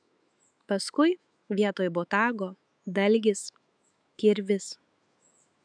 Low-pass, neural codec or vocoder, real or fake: 9.9 kHz; autoencoder, 48 kHz, 128 numbers a frame, DAC-VAE, trained on Japanese speech; fake